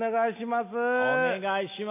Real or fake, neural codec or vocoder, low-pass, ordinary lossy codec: real; none; 3.6 kHz; MP3, 24 kbps